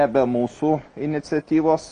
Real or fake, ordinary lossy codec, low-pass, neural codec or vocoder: real; Opus, 32 kbps; 9.9 kHz; none